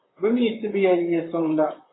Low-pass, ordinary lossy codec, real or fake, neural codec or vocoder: 7.2 kHz; AAC, 16 kbps; fake; vocoder, 44.1 kHz, 128 mel bands, Pupu-Vocoder